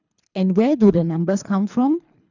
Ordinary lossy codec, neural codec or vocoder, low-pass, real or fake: none; codec, 24 kHz, 3 kbps, HILCodec; 7.2 kHz; fake